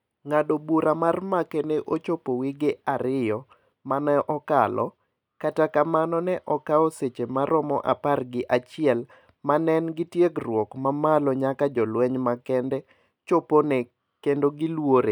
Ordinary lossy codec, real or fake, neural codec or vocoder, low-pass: none; real; none; 19.8 kHz